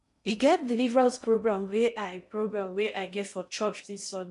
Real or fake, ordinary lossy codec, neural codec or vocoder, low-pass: fake; none; codec, 16 kHz in and 24 kHz out, 0.6 kbps, FocalCodec, streaming, 2048 codes; 10.8 kHz